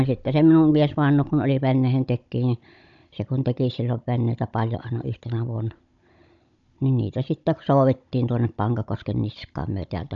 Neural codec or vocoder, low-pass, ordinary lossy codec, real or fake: codec, 16 kHz, 16 kbps, FunCodec, trained on Chinese and English, 50 frames a second; 7.2 kHz; none; fake